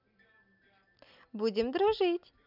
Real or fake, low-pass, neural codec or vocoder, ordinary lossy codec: real; 5.4 kHz; none; none